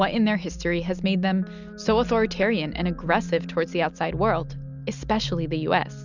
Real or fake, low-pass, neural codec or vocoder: real; 7.2 kHz; none